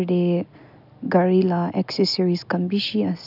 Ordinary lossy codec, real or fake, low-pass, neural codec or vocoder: none; real; 5.4 kHz; none